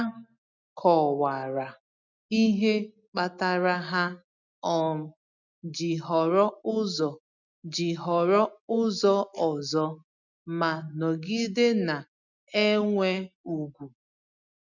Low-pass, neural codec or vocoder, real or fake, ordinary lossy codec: 7.2 kHz; none; real; none